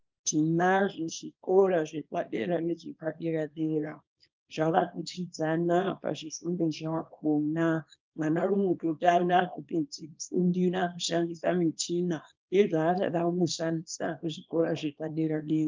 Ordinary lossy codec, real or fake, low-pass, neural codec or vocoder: Opus, 24 kbps; fake; 7.2 kHz; codec, 24 kHz, 0.9 kbps, WavTokenizer, small release